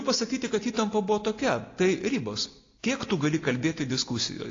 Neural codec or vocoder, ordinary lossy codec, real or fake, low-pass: none; AAC, 32 kbps; real; 7.2 kHz